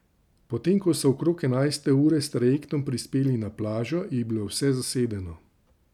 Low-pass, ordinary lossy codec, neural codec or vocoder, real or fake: 19.8 kHz; none; none; real